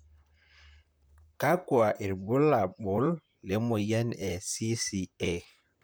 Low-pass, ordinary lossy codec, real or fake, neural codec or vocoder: none; none; fake; vocoder, 44.1 kHz, 128 mel bands, Pupu-Vocoder